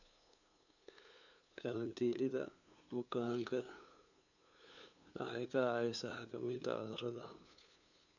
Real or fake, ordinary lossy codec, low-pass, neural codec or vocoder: fake; none; 7.2 kHz; codec, 16 kHz, 2 kbps, FunCodec, trained on LibriTTS, 25 frames a second